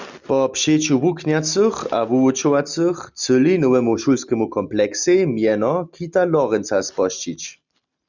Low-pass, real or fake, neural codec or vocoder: 7.2 kHz; real; none